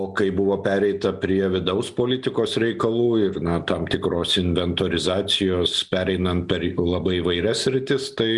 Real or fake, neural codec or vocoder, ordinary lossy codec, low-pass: real; none; Opus, 64 kbps; 10.8 kHz